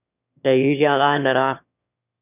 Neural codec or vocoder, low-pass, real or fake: autoencoder, 22.05 kHz, a latent of 192 numbers a frame, VITS, trained on one speaker; 3.6 kHz; fake